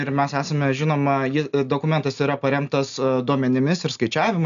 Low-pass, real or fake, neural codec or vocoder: 7.2 kHz; real; none